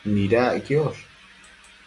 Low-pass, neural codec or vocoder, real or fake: 10.8 kHz; none; real